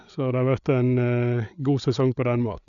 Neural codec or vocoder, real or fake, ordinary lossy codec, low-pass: codec, 16 kHz, 8 kbps, FreqCodec, larger model; fake; none; 7.2 kHz